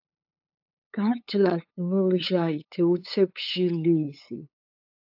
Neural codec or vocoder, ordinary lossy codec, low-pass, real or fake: codec, 16 kHz, 8 kbps, FunCodec, trained on LibriTTS, 25 frames a second; AAC, 48 kbps; 5.4 kHz; fake